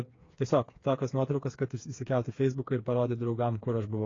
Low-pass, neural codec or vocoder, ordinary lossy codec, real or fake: 7.2 kHz; codec, 16 kHz, 4 kbps, FreqCodec, smaller model; AAC, 32 kbps; fake